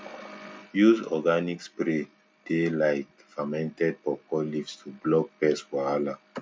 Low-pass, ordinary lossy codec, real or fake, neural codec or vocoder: none; none; real; none